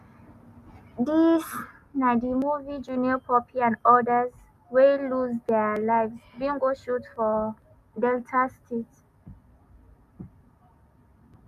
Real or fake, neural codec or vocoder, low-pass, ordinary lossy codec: real; none; 14.4 kHz; none